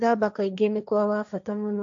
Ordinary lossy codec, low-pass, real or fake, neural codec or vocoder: AAC, 64 kbps; 7.2 kHz; fake; codec, 16 kHz, 1.1 kbps, Voila-Tokenizer